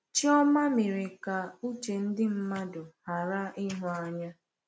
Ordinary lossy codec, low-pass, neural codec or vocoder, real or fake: none; none; none; real